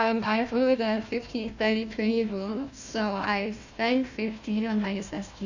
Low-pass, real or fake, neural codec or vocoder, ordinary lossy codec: 7.2 kHz; fake; codec, 16 kHz, 1 kbps, FunCodec, trained on Chinese and English, 50 frames a second; none